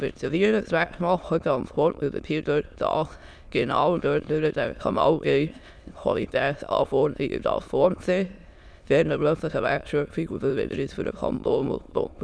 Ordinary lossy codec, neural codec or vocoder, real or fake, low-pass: none; autoencoder, 22.05 kHz, a latent of 192 numbers a frame, VITS, trained on many speakers; fake; none